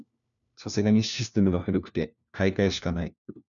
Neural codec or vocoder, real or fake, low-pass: codec, 16 kHz, 1 kbps, FunCodec, trained on LibriTTS, 50 frames a second; fake; 7.2 kHz